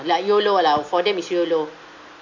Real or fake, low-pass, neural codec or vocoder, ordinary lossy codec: real; 7.2 kHz; none; AAC, 48 kbps